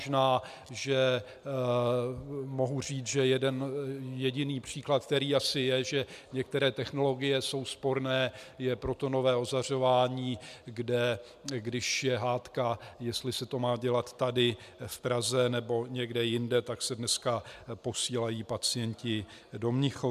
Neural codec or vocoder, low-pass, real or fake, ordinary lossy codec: none; 14.4 kHz; real; MP3, 96 kbps